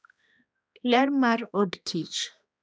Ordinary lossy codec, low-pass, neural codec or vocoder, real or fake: none; none; codec, 16 kHz, 1 kbps, X-Codec, HuBERT features, trained on balanced general audio; fake